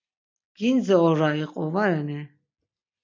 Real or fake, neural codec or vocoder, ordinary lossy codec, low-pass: real; none; MP3, 48 kbps; 7.2 kHz